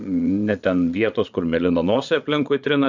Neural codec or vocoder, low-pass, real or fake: vocoder, 22.05 kHz, 80 mel bands, Vocos; 7.2 kHz; fake